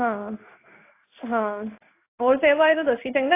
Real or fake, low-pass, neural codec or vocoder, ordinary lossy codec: fake; 3.6 kHz; codec, 16 kHz in and 24 kHz out, 1 kbps, XY-Tokenizer; AAC, 24 kbps